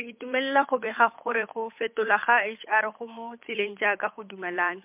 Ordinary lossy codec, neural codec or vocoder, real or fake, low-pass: MP3, 32 kbps; codec, 16 kHz, 8 kbps, FunCodec, trained on Chinese and English, 25 frames a second; fake; 3.6 kHz